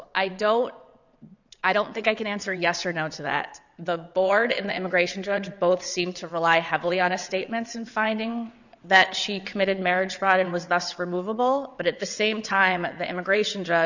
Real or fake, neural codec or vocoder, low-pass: fake; vocoder, 22.05 kHz, 80 mel bands, WaveNeXt; 7.2 kHz